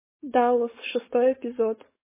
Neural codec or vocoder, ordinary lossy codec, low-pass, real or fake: codec, 16 kHz, 4.8 kbps, FACodec; MP3, 16 kbps; 3.6 kHz; fake